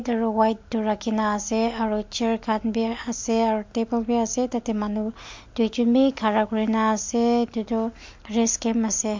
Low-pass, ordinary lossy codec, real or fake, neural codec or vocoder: 7.2 kHz; MP3, 48 kbps; real; none